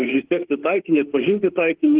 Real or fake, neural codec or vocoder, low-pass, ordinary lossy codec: fake; autoencoder, 48 kHz, 32 numbers a frame, DAC-VAE, trained on Japanese speech; 3.6 kHz; Opus, 16 kbps